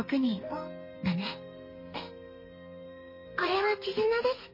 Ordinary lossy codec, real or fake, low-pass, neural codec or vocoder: MP3, 24 kbps; fake; 5.4 kHz; codec, 32 kHz, 1.9 kbps, SNAC